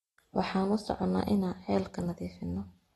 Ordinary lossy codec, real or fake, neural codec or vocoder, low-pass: AAC, 32 kbps; real; none; 19.8 kHz